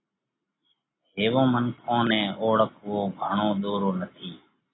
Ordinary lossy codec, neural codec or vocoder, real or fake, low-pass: AAC, 16 kbps; none; real; 7.2 kHz